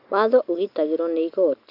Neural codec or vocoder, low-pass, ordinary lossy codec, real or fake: none; 5.4 kHz; none; real